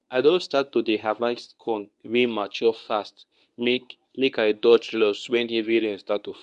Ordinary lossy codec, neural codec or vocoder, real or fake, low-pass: none; codec, 24 kHz, 0.9 kbps, WavTokenizer, medium speech release version 1; fake; 10.8 kHz